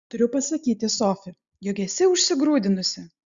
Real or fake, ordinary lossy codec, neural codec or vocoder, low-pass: real; Opus, 64 kbps; none; 7.2 kHz